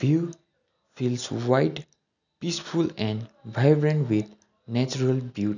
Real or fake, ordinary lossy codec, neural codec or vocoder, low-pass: real; none; none; 7.2 kHz